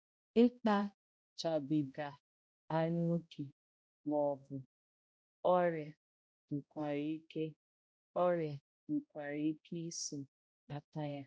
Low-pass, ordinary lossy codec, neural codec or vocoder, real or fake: none; none; codec, 16 kHz, 0.5 kbps, X-Codec, HuBERT features, trained on balanced general audio; fake